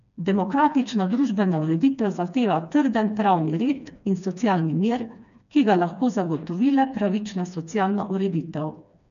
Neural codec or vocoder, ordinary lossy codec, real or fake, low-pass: codec, 16 kHz, 2 kbps, FreqCodec, smaller model; none; fake; 7.2 kHz